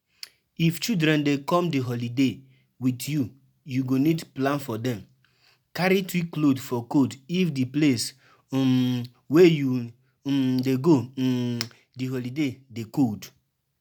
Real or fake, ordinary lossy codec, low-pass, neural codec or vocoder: real; none; none; none